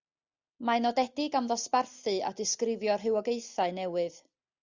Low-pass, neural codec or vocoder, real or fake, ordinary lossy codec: 7.2 kHz; none; real; Opus, 64 kbps